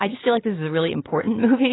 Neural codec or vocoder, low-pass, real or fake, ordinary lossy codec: none; 7.2 kHz; real; AAC, 16 kbps